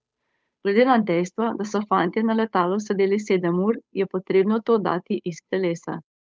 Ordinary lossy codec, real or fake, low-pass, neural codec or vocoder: none; fake; none; codec, 16 kHz, 8 kbps, FunCodec, trained on Chinese and English, 25 frames a second